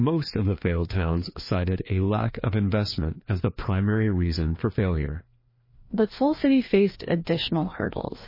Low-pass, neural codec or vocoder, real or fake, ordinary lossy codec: 5.4 kHz; codec, 16 kHz, 2 kbps, FreqCodec, larger model; fake; MP3, 24 kbps